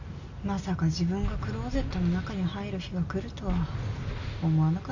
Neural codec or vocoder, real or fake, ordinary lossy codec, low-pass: none; real; none; 7.2 kHz